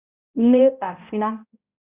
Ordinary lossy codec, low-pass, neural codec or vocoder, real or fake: Opus, 64 kbps; 3.6 kHz; codec, 16 kHz, 0.5 kbps, X-Codec, HuBERT features, trained on balanced general audio; fake